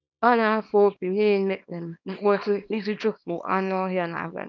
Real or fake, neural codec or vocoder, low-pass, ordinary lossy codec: fake; codec, 24 kHz, 0.9 kbps, WavTokenizer, small release; 7.2 kHz; none